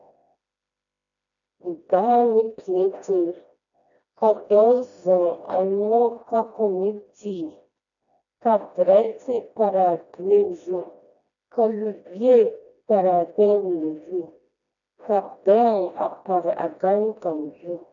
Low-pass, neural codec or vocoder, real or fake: 7.2 kHz; codec, 16 kHz, 1 kbps, FreqCodec, smaller model; fake